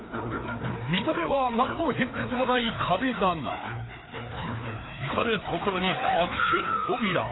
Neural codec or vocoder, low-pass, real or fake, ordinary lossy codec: codec, 16 kHz, 2 kbps, FreqCodec, larger model; 7.2 kHz; fake; AAC, 16 kbps